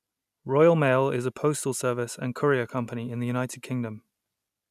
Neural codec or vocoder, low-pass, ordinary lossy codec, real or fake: none; 14.4 kHz; none; real